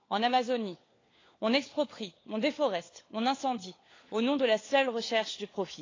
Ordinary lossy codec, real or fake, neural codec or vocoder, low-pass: AAC, 32 kbps; fake; codec, 16 kHz, 4 kbps, FunCodec, trained on LibriTTS, 50 frames a second; 7.2 kHz